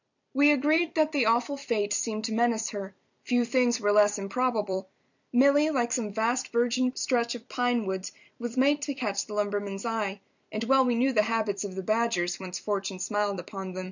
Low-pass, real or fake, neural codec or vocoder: 7.2 kHz; fake; vocoder, 44.1 kHz, 128 mel bands every 256 samples, BigVGAN v2